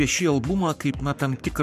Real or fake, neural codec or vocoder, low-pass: fake; codec, 44.1 kHz, 7.8 kbps, Pupu-Codec; 14.4 kHz